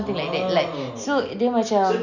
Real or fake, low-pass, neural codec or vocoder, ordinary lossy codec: real; 7.2 kHz; none; none